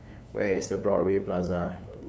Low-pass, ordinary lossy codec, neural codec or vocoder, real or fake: none; none; codec, 16 kHz, 2 kbps, FunCodec, trained on LibriTTS, 25 frames a second; fake